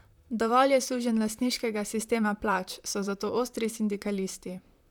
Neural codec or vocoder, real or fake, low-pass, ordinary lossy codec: vocoder, 44.1 kHz, 128 mel bands, Pupu-Vocoder; fake; 19.8 kHz; none